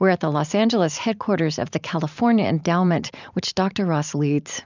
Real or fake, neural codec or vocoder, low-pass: fake; vocoder, 44.1 kHz, 128 mel bands every 512 samples, BigVGAN v2; 7.2 kHz